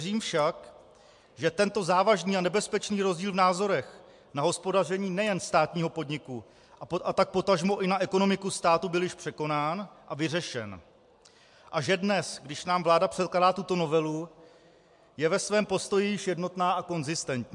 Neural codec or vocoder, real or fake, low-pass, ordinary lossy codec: none; real; 10.8 kHz; MP3, 64 kbps